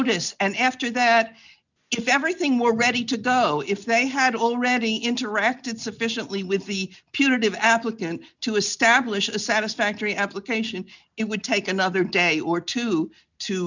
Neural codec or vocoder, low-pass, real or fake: none; 7.2 kHz; real